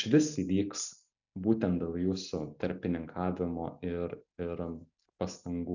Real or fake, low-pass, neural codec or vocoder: real; 7.2 kHz; none